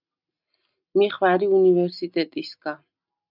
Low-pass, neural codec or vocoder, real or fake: 5.4 kHz; none; real